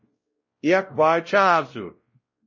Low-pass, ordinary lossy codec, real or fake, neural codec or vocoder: 7.2 kHz; MP3, 32 kbps; fake; codec, 16 kHz, 0.5 kbps, X-Codec, WavLM features, trained on Multilingual LibriSpeech